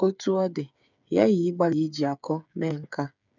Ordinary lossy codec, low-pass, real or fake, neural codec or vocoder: none; 7.2 kHz; fake; codec, 16 kHz, 8 kbps, FreqCodec, smaller model